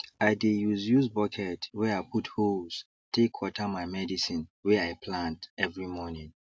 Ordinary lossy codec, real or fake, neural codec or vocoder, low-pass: none; real; none; none